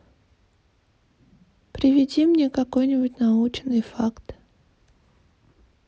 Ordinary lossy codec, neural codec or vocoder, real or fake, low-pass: none; none; real; none